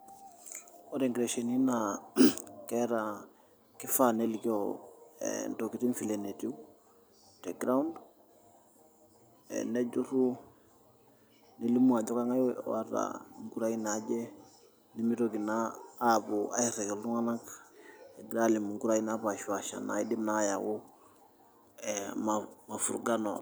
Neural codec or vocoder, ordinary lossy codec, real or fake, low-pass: none; none; real; none